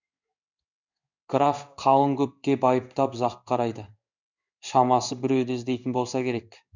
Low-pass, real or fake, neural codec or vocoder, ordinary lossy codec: 7.2 kHz; fake; codec, 16 kHz in and 24 kHz out, 1 kbps, XY-Tokenizer; none